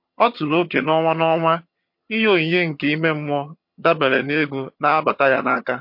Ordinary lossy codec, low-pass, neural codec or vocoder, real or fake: MP3, 32 kbps; 5.4 kHz; vocoder, 22.05 kHz, 80 mel bands, HiFi-GAN; fake